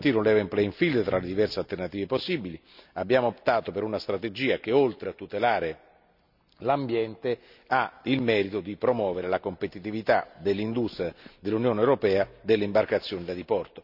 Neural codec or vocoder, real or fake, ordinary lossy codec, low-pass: none; real; none; 5.4 kHz